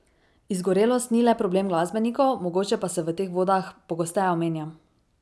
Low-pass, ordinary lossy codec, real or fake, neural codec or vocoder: none; none; real; none